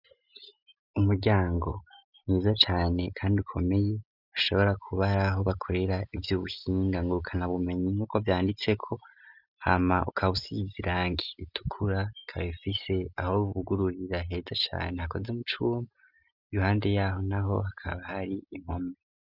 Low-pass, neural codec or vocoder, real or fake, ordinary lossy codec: 5.4 kHz; none; real; Opus, 64 kbps